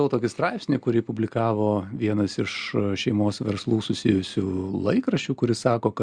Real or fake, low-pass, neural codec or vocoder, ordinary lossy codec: real; 9.9 kHz; none; Opus, 64 kbps